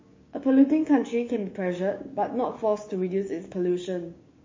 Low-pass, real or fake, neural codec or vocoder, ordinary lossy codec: 7.2 kHz; fake; codec, 16 kHz, 6 kbps, DAC; MP3, 32 kbps